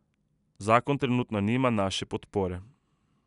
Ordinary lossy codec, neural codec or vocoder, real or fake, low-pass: none; none; real; 10.8 kHz